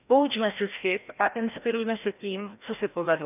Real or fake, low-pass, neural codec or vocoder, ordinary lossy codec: fake; 3.6 kHz; codec, 16 kHz, 1 kbps, FreqCodec, larger model; none